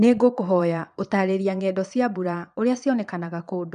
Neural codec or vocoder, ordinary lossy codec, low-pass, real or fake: vocoder, 22.05 kHz, 80 mel bands, Vocos; none; 9.9 kHz; fake